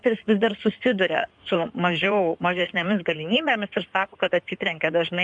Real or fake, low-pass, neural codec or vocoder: fake; 9.9 kHz; codec, 16 kHz in and 24 kHz out, 2.2 kbps, FireRedTTS-2 codec